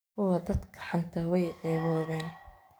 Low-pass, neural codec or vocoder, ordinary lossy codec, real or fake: none; codec, 44.1 kHz, 7.8 kbps, DAC; none; fake